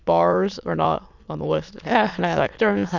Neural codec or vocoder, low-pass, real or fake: autoencoder, 22.05 kHz, a latent of 192 numbers a frame, VITS, trained on many speakers; 7.2 kHz; fake